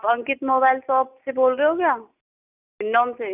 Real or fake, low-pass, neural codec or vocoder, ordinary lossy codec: real; 3.6 kHz; none; none